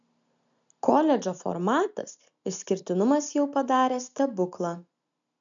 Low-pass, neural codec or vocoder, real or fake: 7.2 kHz; none; real